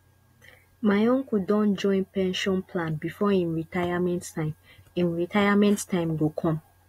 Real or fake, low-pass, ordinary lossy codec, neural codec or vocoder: real; 19.8 kHz; AAC, 48 kbps; none